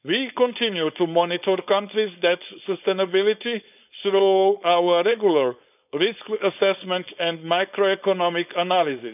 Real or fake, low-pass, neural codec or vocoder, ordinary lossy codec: fake; 3.6 kHz; codec, 16 kHz, 4.8 kbps, FACodec; none